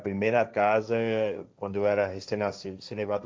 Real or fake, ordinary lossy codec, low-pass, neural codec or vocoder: fake; none; none; codec, 16 kHz, 1.1 kbps, Voila-Tokenizer